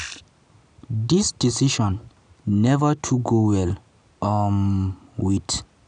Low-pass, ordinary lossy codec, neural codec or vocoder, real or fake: 9.9 kHz; none; none; real